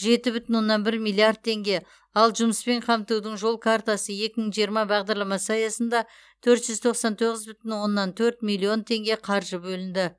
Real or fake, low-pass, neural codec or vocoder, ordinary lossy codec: real; none; none; none